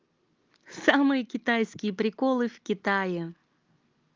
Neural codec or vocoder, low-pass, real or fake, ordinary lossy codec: none; 7.2 kHz; real; Opus, 32 kbps